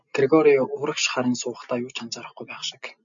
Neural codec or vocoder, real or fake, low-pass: none; real; 7.2 kHz